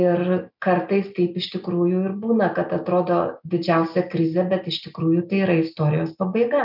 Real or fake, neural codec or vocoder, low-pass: real; none; 5.4 kHz